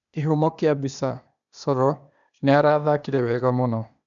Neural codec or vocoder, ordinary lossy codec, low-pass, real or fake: codec, 16 kHz, 0.8 kbps, ZipCodec; none; 7.2 kHz; fake